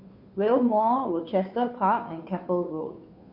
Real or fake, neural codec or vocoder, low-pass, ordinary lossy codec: fake; codec, 16 kHz, 2 kbps, FunCodec, trained on Chinese and English, 25 frames a second; 5.4 kHz; MP3, 48 kbps